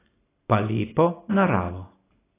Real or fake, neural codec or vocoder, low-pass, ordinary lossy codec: real; none; 3.6 kHz; AAC, 16 kbps